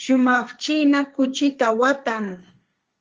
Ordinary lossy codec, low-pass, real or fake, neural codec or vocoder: Opus, 24 kbps; 7.2 kHz; fake; codec, 16 kHz, 1.1 kbps, Voila-Tokenizer